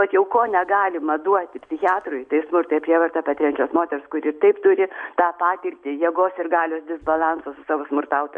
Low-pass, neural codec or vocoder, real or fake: 9.9 kHz; none; real